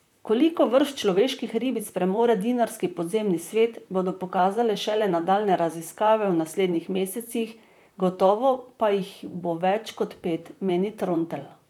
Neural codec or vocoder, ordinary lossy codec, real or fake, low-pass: vocoder, 44.1 kHz, 128 mel bands, Pupu-Vocoder; none; fake; 19.8 kHz